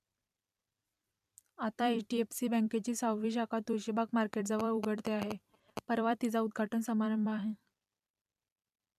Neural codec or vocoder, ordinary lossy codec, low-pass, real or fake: vocoder, 44.1 kHz, 128 mel bands every 256 samples, BigVGAN v2; none; 14.4 kHz; fake